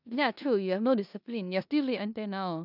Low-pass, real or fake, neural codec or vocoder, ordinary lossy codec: 5.4 kHz; fake; codec, 16 kHz in and 24 kHz out, 0.9 kbps, LongCat-Audio-Codec, four codebook decoder; none